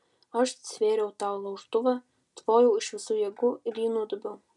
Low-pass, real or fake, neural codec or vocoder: 10.8 kHz; real; none